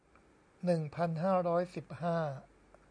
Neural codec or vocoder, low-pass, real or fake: none; 9.9 kHz; real